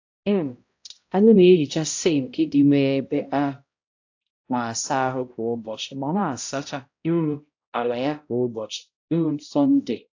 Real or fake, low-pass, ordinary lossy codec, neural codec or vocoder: fake; 7.2 kHz; AAC, 48 kbps; codec, 16 kHz, 0.5 kbps, X-Codec, HuBERT features, trained on balanced general audio